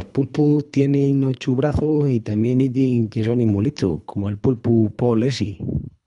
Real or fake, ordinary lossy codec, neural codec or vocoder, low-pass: fake; none; codec, 24 kHz, 3 kbps, HILCodec; 10.8 kHz